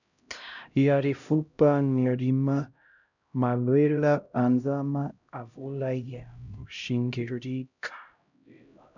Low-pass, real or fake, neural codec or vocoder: 7.2 kHz; fake; codec, 16 kHz, 0.5 kbps, X-Codec, HuBERT features, trained on LibriSpeech